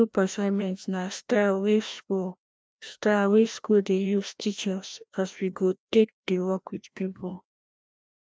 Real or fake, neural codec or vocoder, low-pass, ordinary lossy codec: fake; codec, 16 kHz, 1 kbps, FreqCodec, larger model; none; none